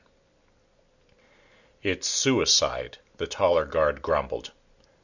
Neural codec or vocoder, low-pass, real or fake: none; 7.2 kHz; real